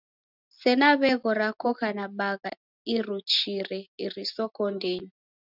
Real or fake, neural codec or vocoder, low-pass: real; none; 5.4 kHz